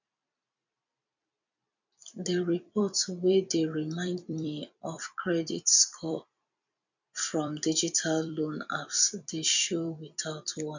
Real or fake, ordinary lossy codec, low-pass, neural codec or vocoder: fake; none; 7.2 kHz; vocoder, 44.1 kHz, 128 mel bands every 256 samples, BigVGAN v2